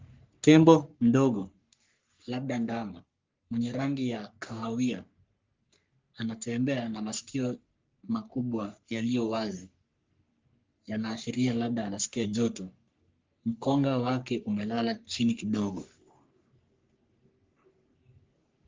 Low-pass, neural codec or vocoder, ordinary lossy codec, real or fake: 7.2 kHz; codec, 44.1 kHz, 3.4 kbps, Pupu-Codec; Opus, 32 kbps; fake